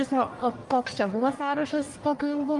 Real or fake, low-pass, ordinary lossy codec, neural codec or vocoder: fake; 10.8 kHz; Opus, 32 kbps; codec, 44.1 kHz, 1.7 kbps, Pupu-Codec